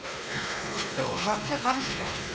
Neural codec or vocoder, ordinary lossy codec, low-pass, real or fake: codec, 16 kHz, 2 kbps, X-Codec, WavLM features, trained on Multilingual LibriSpeech; none; none; fake